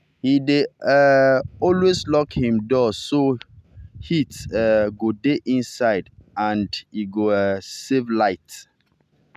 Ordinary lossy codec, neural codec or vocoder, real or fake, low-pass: none; none; real; 14.4 kHz